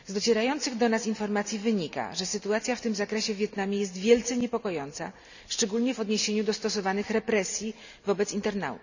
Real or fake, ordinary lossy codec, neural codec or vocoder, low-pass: real; none; none; 7.2 kHz